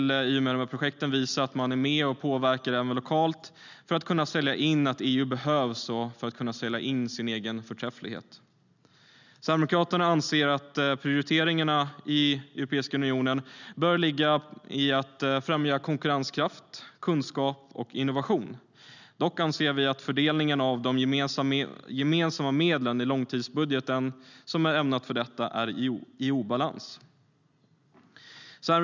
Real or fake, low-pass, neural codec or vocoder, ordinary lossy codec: real; 7.2 kHz; none; none